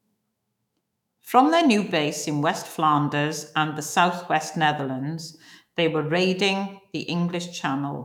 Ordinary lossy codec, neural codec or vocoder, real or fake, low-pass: none; autoencoder, 48 kHz, 128 numbers a frame, DAC-VAE, trained on Japanese speech; fake; 19.8 kHz